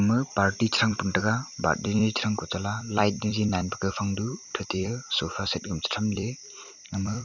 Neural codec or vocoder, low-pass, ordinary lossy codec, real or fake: vocoder, 44.1 kHz, 128 mel bands every 256 samples, BigVGAN v2; 7.2 kHz; none; fake